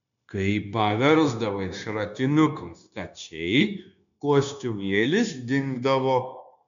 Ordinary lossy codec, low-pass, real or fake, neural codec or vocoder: MP3, 96 kbps; 7.2 kHz; fake; codec, 16 kHz, 0.9 kbps, LongCat-Audio-Codec